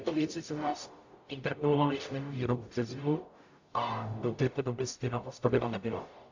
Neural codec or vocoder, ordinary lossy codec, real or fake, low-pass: codec, 44.1 kHz, 0.9 kbps, DAC; AAC, 48 kbps; fake; 7.2 kHz